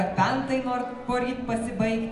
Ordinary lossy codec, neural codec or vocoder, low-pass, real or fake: AAC, 64 kbps; none; 10.8 kHz; real